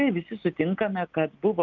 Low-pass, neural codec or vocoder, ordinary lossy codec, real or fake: 7.2 kHz; none; Opus, 24 kbps; real